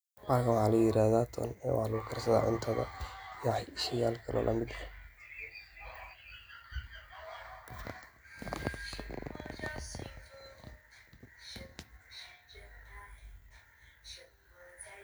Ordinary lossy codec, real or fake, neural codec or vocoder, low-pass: none; real; none; none